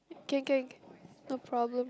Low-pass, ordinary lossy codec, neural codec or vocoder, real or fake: none; none; none; real